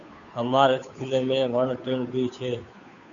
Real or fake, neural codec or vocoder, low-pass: fake; codec, 16 kHz, 2 kbps, FunCodec, trained on Chinese and English, 25 frames a second; 7.2 kHz